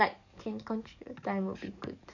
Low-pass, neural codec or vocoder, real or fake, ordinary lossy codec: 7.2 kHz; codec, 44.1 kHz, 7.8 kbps, DAC; fake; none